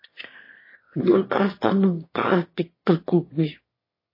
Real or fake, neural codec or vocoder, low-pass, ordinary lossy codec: fake; autoencoder, 22.05 kHz, a latent of 192 numbers a frame, VITS, trained on one speaker; 5.4 kHz; MP3, 24 kbps